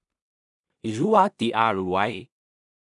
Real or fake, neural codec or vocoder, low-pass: fake; codec, 16 kHz in and 24 kHz out, 0.4 kbps, LongCat-Audio-Codec, two codebook decoder; 10.8 kHz